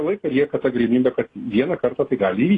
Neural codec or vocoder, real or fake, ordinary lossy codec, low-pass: none; real; AAC, 32 kbps; 10.8 kHz